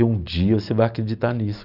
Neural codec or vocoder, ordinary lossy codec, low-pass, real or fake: none; none; 5.4 kHz; real